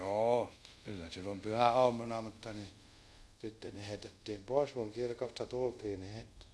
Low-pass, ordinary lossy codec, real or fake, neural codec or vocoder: none; none; fake; codec, 24 kHz, 0.5 kbps, DualCodec